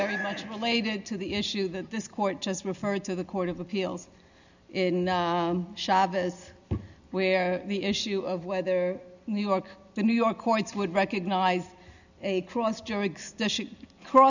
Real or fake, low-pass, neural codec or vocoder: real; 7.2 kHz; none